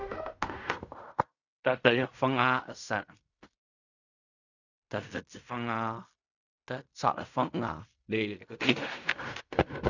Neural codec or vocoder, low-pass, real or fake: codec, 16 kHz in and 24 kHz out, 0.4 kbps, LongCat-Audio-Codec, fine tuned four codebook decoder; 7.2 kHz; fake